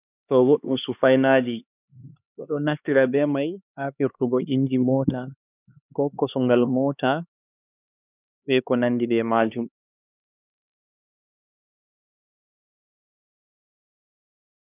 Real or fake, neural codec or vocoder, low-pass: fake; codec, 16 kHz, 2 kbps, X-Codec, HuBERT features, trained on LibriSpeech; 3.6 kHz